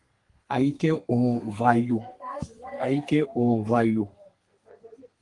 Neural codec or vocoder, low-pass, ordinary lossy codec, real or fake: codec, 32 kHz, 1.9 kbps, SNAC; 10.8 kHz; Opus, 24 kbps; fake